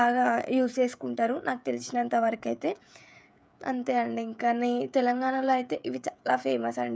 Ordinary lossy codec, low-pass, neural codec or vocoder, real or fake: none; none; codec, 16 kHz, 16 kbps, FreqCodec, smaller model; fake